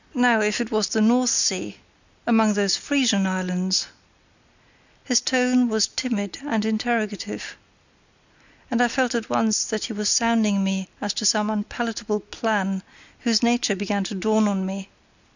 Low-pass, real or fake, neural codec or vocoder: 7.2 kHz; real; none